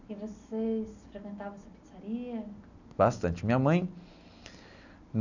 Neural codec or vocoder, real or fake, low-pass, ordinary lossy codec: none; real; 7.2 kHz; none